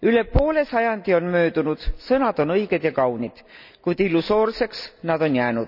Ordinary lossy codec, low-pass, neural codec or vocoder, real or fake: none; 5.4 kHz; none; real